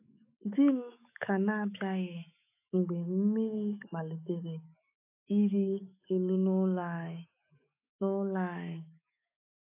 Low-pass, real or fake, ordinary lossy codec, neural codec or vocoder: 3.6 kHz; fake; AAC, 32 kbps; autoencoder, 48 kHz, 128 numbers a frame, DAC-VAE, trained on Japanese speech